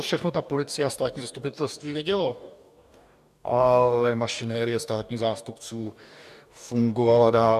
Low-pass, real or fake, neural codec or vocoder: 14.4 kHz; fake; codec, 44.1 kHz, 2.6 kbps, DAC